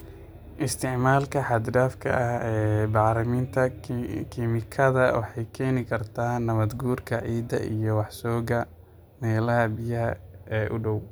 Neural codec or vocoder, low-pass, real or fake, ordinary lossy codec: none; none; real; none